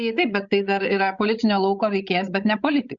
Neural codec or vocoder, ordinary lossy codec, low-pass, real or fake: codec, 16 kHz, 16 kbps, FreqCodec, larger model; Opus, 64 kbps; 7.2 kHz; fake